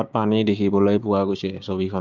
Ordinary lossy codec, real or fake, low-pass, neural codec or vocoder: Opus, 24 kbps; fake; 7.2 kHz; codec, 16 kHz, 6 kbps, DAC